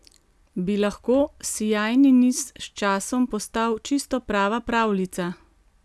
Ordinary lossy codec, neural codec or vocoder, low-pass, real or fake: none; none; none; real